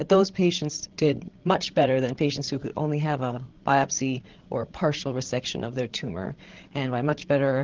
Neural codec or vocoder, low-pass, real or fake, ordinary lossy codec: codec, 16 kHz, 8 kbps, FreqCodec, larger model; 7.2 kHz; fake; Opus, 16 kbps